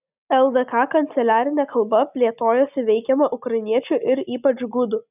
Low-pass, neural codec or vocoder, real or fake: 3.6 kHz; none; real